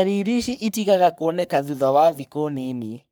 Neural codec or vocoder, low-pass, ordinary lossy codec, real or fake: codec, 44.1 kHz, 3.4 kbps, Pupu-Codec; none; none; fake